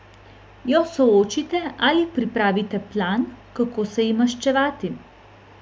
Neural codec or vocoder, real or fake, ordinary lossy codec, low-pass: none; real; none; none